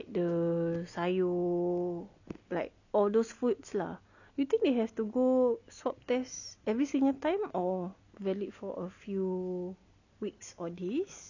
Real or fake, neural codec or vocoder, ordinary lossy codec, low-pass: real; none; none; 7.2 kHz